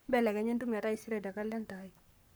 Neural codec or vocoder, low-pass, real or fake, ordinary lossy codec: codec, 44.1 kHz, 7.8 kbps, Pupu-Codec; none; fake; none